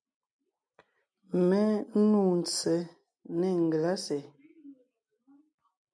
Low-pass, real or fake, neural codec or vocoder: 9.9 kHz; real; none